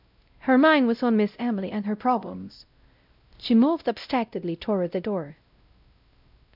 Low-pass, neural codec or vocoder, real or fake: 5.4 kHz; codec, 16 kHz, 0.5 kbps, X-Codec, WavLM features, trained on Multilingual LibriSpeech; fake